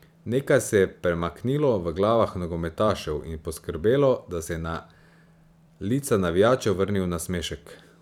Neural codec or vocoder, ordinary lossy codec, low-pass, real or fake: vocoder, 44.1 kHz, 128 mel bands every 512 samples, BigVGAN v2; none; 19.8 kHz; fake